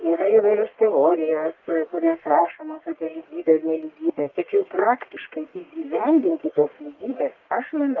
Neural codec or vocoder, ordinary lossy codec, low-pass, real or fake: codec, 44.1 kHz, 1.7 kbps, Pupu-Codec; Opus, 24 kbps; 7.2 kHz; fake